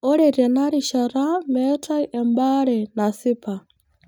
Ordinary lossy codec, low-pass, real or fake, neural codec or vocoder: none; none; real; none